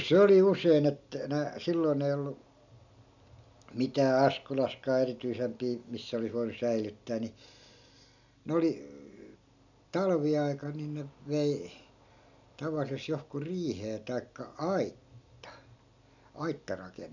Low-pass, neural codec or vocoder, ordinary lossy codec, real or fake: 7.2 kHz; none; none; real